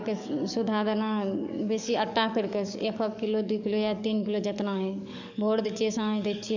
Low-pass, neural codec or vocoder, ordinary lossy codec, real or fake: 7.2 kHz; codec, 44.1 kHz, 7.8 kbps, DAC; none; fake